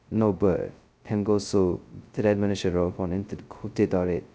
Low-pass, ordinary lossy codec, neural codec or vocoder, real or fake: none; none; codec, 16 kHz, 0.2 kbps, FocalCodec; fake